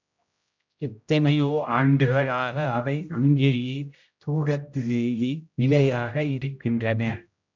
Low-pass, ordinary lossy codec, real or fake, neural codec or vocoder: 7.2 kHz; MP3, 64 kbps; fake; codec, 16 kHz, 0.5 kbps, X-Codec, HuBERT features, trained on general audio